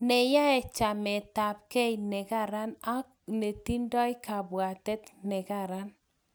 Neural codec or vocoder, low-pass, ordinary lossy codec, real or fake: none; none; none; real